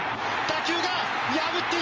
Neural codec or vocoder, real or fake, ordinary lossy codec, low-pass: none; real; Opus, 24 kbps; 7.2 kHz